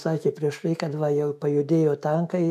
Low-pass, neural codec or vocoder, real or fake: 14.4 kHz; autoencoder, 48 kHz, 128 numbers a frame, DAC-VAE, trained on Japanese speech; fake